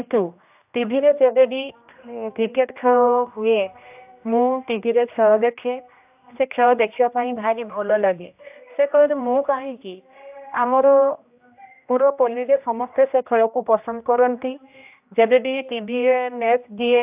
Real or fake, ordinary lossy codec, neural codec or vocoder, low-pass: fake; AAC, 32 kbps; codec, 16 kHz, 1 kbps, X-Codec, HuBERT features, trained on general audio; 3.6 kHz